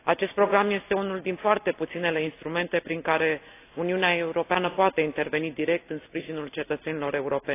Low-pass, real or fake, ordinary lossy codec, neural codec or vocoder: 3.6 kHz; real; AAC, 24 kbps; none